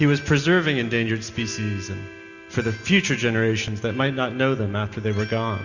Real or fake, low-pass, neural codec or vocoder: real; 7.2 kHz; none